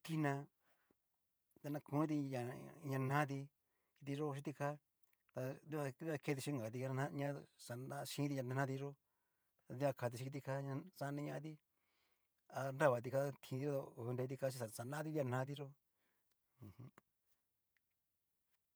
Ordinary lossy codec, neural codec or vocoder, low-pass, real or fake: none; vocoder, 48 kHz, 128 mel bands, Vocos; none; fake